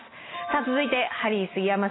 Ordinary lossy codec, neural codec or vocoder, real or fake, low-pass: AAC, 16 kbps; none; real; 7.2 kHz